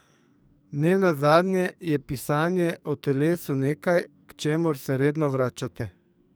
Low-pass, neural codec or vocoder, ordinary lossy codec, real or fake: none; codec, 44.1 kHz, 2.6 kbps, SNAC; none; fake